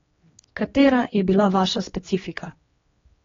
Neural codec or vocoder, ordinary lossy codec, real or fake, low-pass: codec, 16 kHz, 2 kbps, X-Codec, HuBERT features, trained on general audio; AAC, 24 kbps; fake; 7.2 kHz